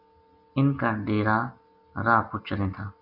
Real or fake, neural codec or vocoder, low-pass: real; none; 5.4 kHz